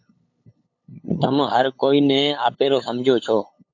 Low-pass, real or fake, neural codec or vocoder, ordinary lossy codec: 7.2 kHz; fake; codec, 16 kHz, 8 kbps, FunCodec, trained on LibriTTS, 25 frames a second; AAC, 48 kbps